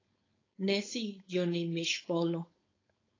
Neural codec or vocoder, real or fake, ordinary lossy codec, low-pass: codec, 16 kHz, 4.8 kbps, FACodec; fake; AAC, 32 kbps; 7.2 kHz